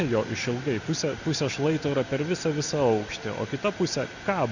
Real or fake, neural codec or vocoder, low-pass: real; none; 7.2 kHz